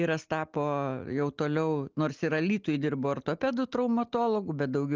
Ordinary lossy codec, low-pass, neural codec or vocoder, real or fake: Opus, 32 kbps; 7.2 kHz; none; real